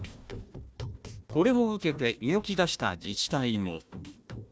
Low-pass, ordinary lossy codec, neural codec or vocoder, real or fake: none; none; codec, 16 kHz, 1 kbps, FunCodec, trained on Chinese and English, 50 frames a second; fake